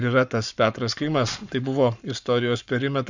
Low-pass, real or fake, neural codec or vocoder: 7.2 kHz; fake; codec, 44.1 kHz, 7.8 kbps, Pupu-Codec